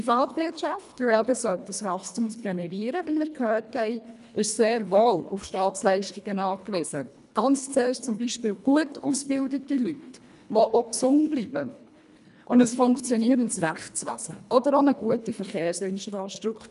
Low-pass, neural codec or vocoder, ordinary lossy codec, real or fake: 10.8 kHz; codec, 24 kHz, 1.5 kbps, HILCodec; none; fake